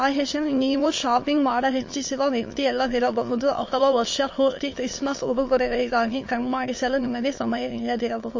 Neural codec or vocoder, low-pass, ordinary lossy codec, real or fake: autoencoder, 22.05 kHz, a latent of 192 numbers a frame, VITS, trained on many speakers; 7.2 kHz; MP3, 32 kbps; fake